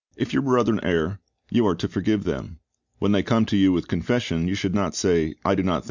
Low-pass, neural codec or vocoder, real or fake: 7.2 kHz; none; real